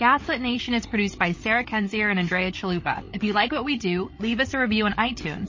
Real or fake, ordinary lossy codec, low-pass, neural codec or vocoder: fake; MP3, 32 kbps; 7.2 kHz; codec, 16 kHz, 8 kbps, FreqCodec, larger model